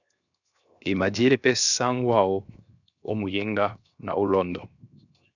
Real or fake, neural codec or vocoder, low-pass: fake; codec, 16 kHz, 0.7 kbps, FocalCodec; 7.2 kHz